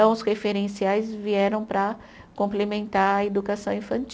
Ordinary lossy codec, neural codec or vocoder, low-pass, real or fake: none; none; none; real